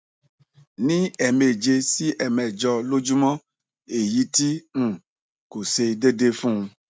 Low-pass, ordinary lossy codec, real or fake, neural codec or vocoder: none; none; real; none